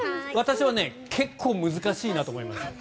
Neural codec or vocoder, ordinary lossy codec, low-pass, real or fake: none; none; none; real